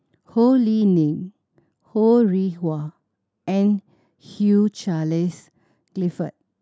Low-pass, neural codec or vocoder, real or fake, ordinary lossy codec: none; none; real; none